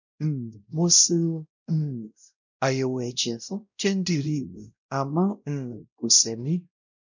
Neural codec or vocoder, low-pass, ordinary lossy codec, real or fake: codec, 16 kHz, 0.5 kbps, X-Codec, WavLM features, trained on Multilingual LibriSpeech; 7.2 kHz; none; fake